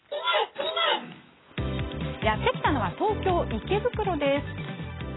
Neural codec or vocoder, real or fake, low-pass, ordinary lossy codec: none; real; 7.2 kHz; AAC, 16 kbps